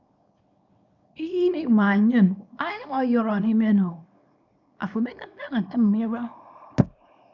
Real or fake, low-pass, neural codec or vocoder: fake; 7.2 kHz; codec, 24 kHz, 0.9 kbps, WavTokenizer, small release